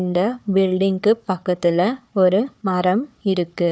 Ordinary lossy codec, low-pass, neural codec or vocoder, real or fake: none; none; codec, 16 kHz, 4 kbps, FunCodec, trained on Chinese and English, 50 frames a second; fake